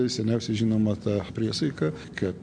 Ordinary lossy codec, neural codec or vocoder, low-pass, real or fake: MP3, 48 kbps; none; 9.9 kHz; real